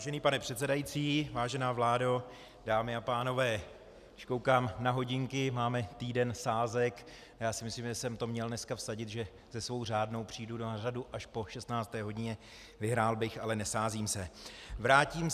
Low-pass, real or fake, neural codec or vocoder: 14.4 kHz; real; none